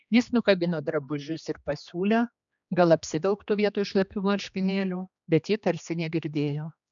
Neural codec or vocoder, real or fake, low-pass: codec, 16 kHz, 2 kbps, X-Codec, HuBERT features, trained on general audio; fake; 7.2 kHz